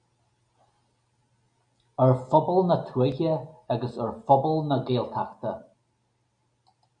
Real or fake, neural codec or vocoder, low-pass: real; none; 9.9 kHz